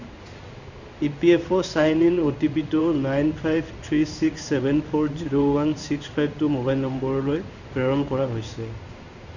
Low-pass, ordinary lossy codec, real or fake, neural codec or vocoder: 7.2 kHz; none; fake; codec, 16 kHz in and 24 kHz out, 1 kbps, XY-Tokenizer